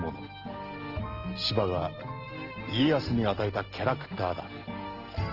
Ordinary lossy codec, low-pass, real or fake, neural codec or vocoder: Opus, 16 kbps; 5.4 kHz; real; none